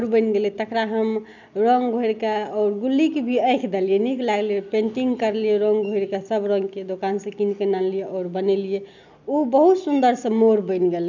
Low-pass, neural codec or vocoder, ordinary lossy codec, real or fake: 7.2 kHz; none; none; real